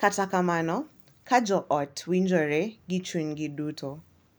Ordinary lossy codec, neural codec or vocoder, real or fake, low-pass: none; none; real; none